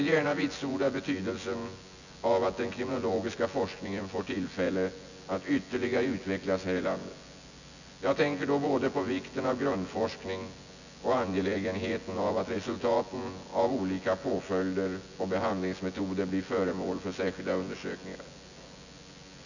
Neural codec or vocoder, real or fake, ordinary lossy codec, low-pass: vocoder, 24 kHz, 100 mel bands, Vocos; fake; none; 7.2 kHz